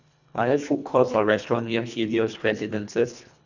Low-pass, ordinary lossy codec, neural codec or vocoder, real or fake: 7.2 kHz; none; codec, 24 kHz, 1.5 kbps, HILCodec; fake